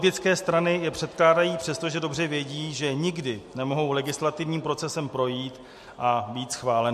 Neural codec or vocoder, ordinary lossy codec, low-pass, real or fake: none; MP3, 64 kbps; 14.4 kHz; real